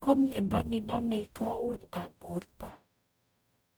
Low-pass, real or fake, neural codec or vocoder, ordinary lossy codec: none; fake; codec, 44.1 kHz, 0.9 kbps, DAC; none